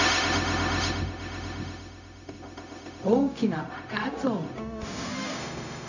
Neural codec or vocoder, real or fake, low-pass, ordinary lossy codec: codec, 16 kHz, 0.4 kbps, LongCat-Audio-Codec; fake; 7.2 kHz; none